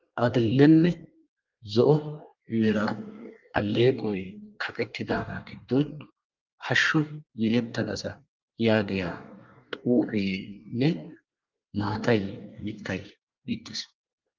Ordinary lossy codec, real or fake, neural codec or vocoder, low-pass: Opus, 24 kbps; fake; codec, 24 kHz, 1 kbps, SNAC; 7.2 kHz